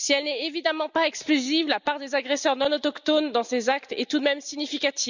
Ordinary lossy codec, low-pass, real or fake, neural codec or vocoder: none; 7.2 kHz; real; none